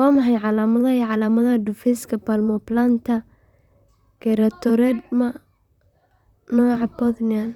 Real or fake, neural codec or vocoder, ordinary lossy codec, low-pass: fake; vocoder, 44.1 kHz, 128 mel bands, Pupu-Vocoder; none; 19.8 kHz